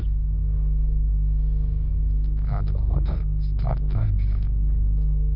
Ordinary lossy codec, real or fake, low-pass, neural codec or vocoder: none; fake; 5.4 kHz; codec, 24 kHz, 0.9 kbps, WavTokenizer, medium music audio release